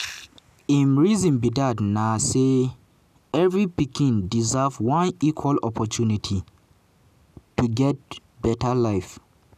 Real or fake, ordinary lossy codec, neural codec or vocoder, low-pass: real; none; none; 14.4 kHz